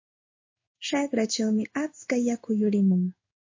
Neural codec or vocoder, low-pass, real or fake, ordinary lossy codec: codec, 16 kHz in and 24 kHz out, 1 kbps, XY-Tokenizer; 7.2 kHz; fake; MP3, 32 kbps